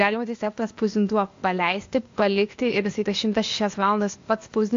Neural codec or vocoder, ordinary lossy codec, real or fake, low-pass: codec, 16 kHz, 0.8 kbps, ZipCodec; AAC, 48 kbps; fake; 7.2 kHz